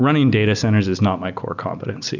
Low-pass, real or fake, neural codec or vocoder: 7.2 kHz; real; none